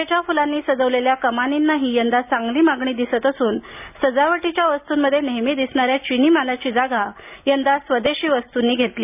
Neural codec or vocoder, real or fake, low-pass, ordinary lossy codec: none; real; 3.6 kHz; none